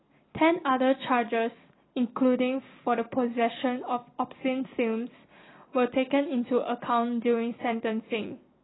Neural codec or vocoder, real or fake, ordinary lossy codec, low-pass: none; real; AAC, 16 kbps; 7.2 kHz